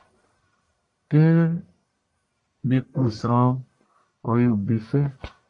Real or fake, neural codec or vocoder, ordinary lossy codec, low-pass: fake; codec, 44.1 kHz, 1.7 kbps, Pupu-Codec; AAC, 64 kbps; 10.8 kHz